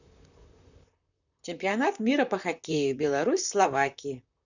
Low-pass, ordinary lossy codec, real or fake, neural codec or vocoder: 7.2 kHz; none; fake; vocoder, 44.1 kHz, 128 mel bands, Pupu-Vocoder